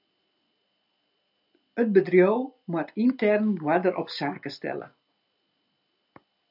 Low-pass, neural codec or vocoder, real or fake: 5.4 kHz; none; real